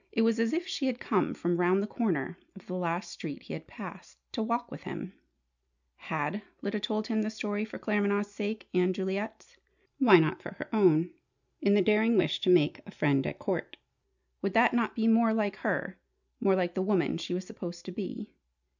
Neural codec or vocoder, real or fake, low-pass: none; real; 7.2 kHz